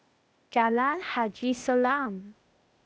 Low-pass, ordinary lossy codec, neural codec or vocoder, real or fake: none; none; codec, 16 kHz, 0.8 kbps, ZipCodec; fake